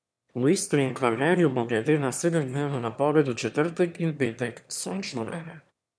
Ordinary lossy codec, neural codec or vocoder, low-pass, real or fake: none; autoencoder, 22.05 kHz, a latent of 192 numbers a frame, VITS, trained on one speaker; none; fake